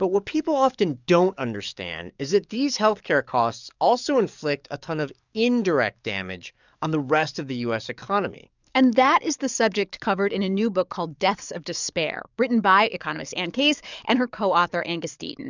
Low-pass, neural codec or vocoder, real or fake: 7.2 kHz; codec, 44.1 kHz, 7.8 kbps, DAC; fake